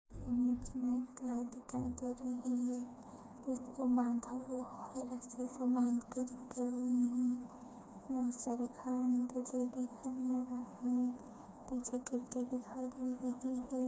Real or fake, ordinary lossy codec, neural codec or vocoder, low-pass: fake; none; codec, 16 kHz, 2 kbps, FreqCodec, smaller model; none